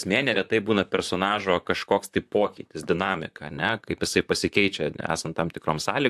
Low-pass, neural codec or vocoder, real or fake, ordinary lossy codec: 14.4 kHz; vocoder, 44.1 kHz, 128 mel bands, Pupu-Vocoder; fake; AAC, 96 kbps